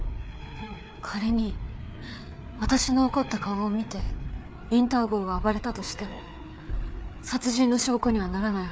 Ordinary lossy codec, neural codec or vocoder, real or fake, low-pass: none; codec, 16 kHz, 4 kbps, FreqCodec, larger model; fake; none